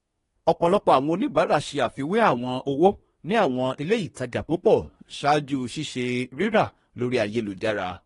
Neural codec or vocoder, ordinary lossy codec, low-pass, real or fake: codec, 24 kHz, 1 kbps, SNAC; AAC, 32 kbps; 10.8 kHz; fake